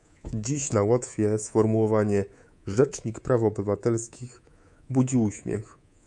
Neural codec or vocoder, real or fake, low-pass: codec, 24 kHz, 3.1 kbps, DualCodec; fake; 10.8 kHz